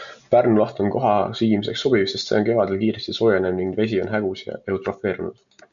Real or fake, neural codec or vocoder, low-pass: real; none; 7.2 kHz